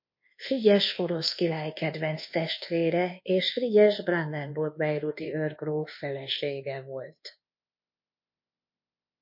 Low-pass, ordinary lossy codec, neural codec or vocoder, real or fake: 5.4 kHz; MP3, 32 kbps; codec, 24 kHz, 1.2 kbps, DualCodec; fake